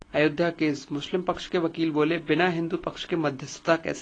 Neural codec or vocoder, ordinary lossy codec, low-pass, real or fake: none; AAC, 32 kbps; 9.9 kHz; real